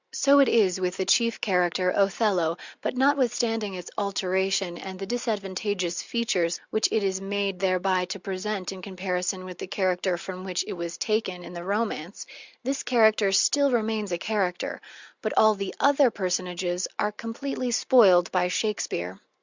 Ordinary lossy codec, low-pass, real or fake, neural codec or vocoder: Opus, 64 kbps; 7.2 kHz; real; none